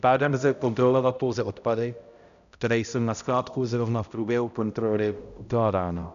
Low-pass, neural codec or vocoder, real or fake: 7.2 kHz; codec, 16 kHz, 0.5 kbps, X-Codec, HuBERT features, trained on balanced general audio; fake